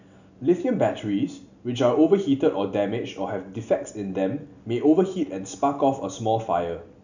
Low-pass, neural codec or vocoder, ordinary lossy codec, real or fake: 7.2 kHz; none; none; real